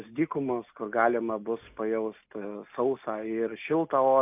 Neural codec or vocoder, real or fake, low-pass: none; real; 3.6 kHz